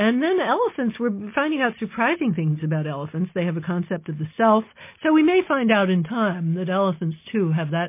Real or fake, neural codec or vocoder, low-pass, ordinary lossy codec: real; none; 3.6 kHz; MP3, 24 kbps